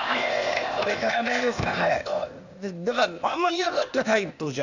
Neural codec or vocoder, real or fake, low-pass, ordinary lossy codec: codec, 16 kHz, 0.8 kbps, ZipCodec; fake; 7.2 kHz; none